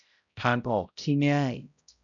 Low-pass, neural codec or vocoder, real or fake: 7.2 kHz; codec, 16 kHz, 0.5 kbps, X-Codec, HuBERT features, trained on balanced general audio; fake